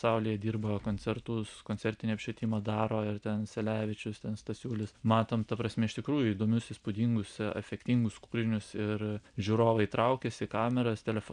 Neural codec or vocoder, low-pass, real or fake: none; 9.9 kHz; real